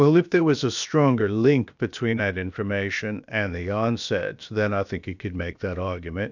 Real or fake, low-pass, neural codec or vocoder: fake; 7.2 kHz; codec, 16 kHz, about 1 kbps, DyCAST, with the encoder's durations